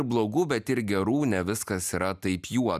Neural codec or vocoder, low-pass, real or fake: none; 14.4 kHz; real